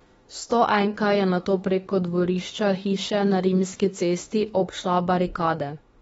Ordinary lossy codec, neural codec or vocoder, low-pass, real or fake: AAC, 24 kbps; autoencoder, 48 kHz, 32 numbers a frame, DAC-VAE, trained on Japanese speech; 19.8 kHz; fake